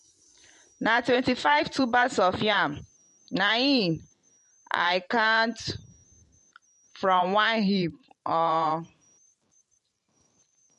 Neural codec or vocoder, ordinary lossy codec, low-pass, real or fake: vocoder, 44.1 kHz, 128 mel bands every 512 samples, BigVGAN v2; MP3, 48 kbps; 14.4 kHz; fake